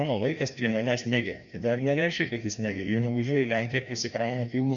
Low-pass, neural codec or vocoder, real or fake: 7.2 kHz; codec, 16 kHz, 1 kbps, FreqCodec, larger model; fake